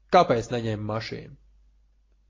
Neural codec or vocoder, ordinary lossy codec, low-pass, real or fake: none; AAC, 32 kbps; 7.2 kHz; real